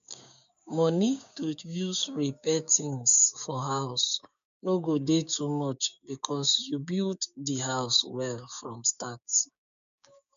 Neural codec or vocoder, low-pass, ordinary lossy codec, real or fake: codec, 16 kHz, 6 kbps, DAC; 7.2 kHz; AAC, 96 kbps; fake